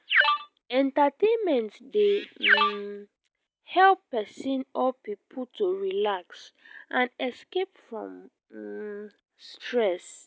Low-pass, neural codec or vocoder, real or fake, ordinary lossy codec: none; none; real; none